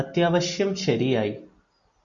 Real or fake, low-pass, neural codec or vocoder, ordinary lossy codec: real; 7.2 kHz; none; Opus, 64 kbps